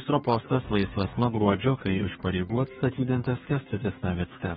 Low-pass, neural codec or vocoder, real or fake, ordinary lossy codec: 14.4 kHz; codec, 32 kHz, 1.9 kbps, SNAC; fake; AAC, 16 kbps